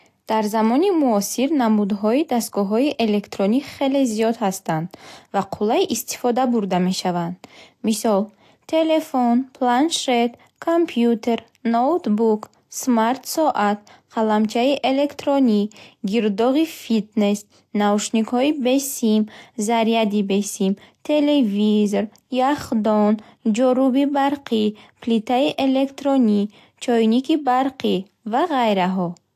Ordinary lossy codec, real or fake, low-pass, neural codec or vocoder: AAC, 64 kbps; real; 14.4 kHz; none